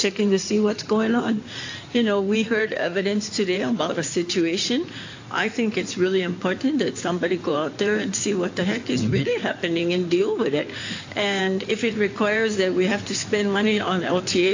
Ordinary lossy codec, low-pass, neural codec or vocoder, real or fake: AAC, 48 kbps; 7.2 kHz; codec, 16 kHz in and 24 kHz out, 2.2 kbps, FireRedTTS-2 codec; fake